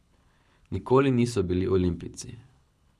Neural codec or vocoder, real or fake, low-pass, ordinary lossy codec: codec, 24 kHz, 6 kbps, HILCodec; fake; none; none